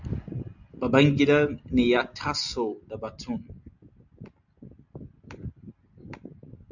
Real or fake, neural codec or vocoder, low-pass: real; none; 7.2 kHz